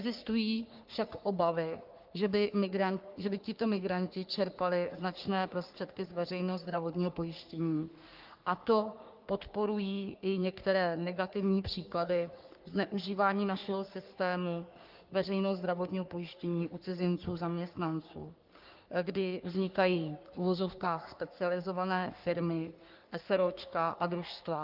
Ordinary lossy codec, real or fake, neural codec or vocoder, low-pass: Opus, 32 kbps; fake; codec, 44.1 kHz, 3.4 kbps, Pupu-Codec; 5.4 kHz